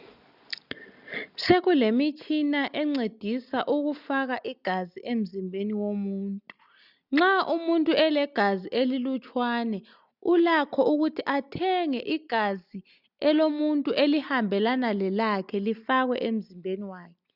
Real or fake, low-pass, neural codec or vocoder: real; 5.4 kHz; none